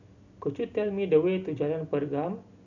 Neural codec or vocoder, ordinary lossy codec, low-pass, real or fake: none; none; 7.2 kHz; real